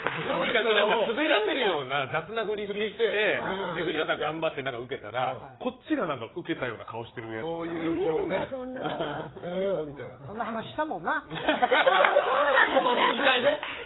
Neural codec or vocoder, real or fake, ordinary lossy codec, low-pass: codec, 16 kHz, 4 kbps, FreqCodec, larger model; fake; AAC, 16 kbps; 7.2 kHz